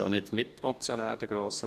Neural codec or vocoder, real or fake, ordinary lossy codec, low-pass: codec, 44.1 kHz, 2.6 kbps, DAC; fake; none; 14.4 kHz